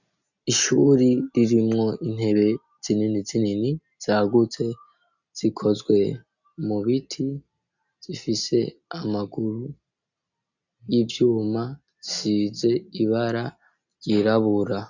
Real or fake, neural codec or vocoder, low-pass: real; none; 7.2 kHz